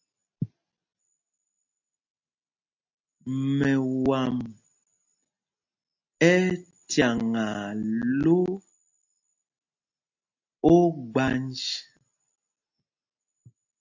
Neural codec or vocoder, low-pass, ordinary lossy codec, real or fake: none; 7.2 kHz; AAC, 48 kbps; real